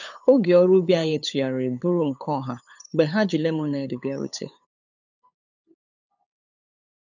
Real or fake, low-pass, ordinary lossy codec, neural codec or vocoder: fake; 7.2 kHz; none; codec, 16 kHz, 8 kbps, FunCodec, trained on LibriTTS, 25 frames a second